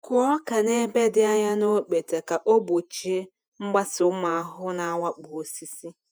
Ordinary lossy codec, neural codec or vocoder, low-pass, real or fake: none; vocoder, 48 kHz, 128 mel bands, Vocos; none; fake